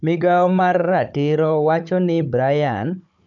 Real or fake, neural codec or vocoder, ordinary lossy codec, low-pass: fake; codec, 16 kHz, 16 kbps, FunCodec, trained on Chinese and English, 50 frames a second; none; 7.2 kHz